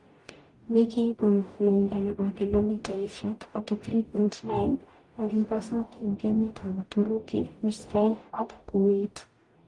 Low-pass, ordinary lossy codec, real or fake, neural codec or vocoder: 10.8 kHz; Opus, 24 kbps; fake; codec, 44.1 kHz, 0.9 kbps, DAC